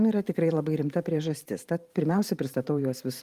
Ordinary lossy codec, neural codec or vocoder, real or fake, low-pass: Opus, 24 kbps; vocoder, 44.1 kHz, 128 mel bands every 512 samples, BigVGAN v2; fake; 14.4 kHz